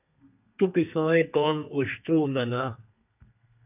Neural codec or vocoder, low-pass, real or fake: codec, 32 kHz, 1.9 kbps, SNAC; 3.6 kHz; fake